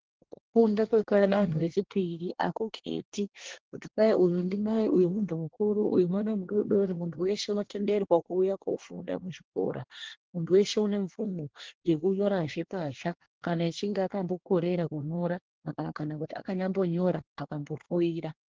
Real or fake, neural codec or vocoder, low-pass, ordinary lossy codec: fake; codec, 24 kHz, 1 kbps, SNAC; 7.2 kHz; Opus, 16 kbps